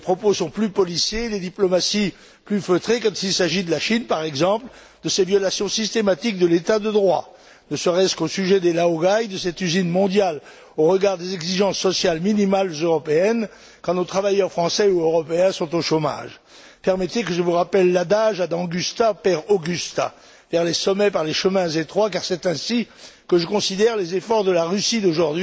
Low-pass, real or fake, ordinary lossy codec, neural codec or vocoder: none; real; none; none